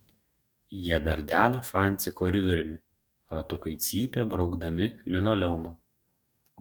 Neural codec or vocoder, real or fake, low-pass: codec, 44.1 kHz, 2.6 kbps, DAC; fake; 19.8 kHz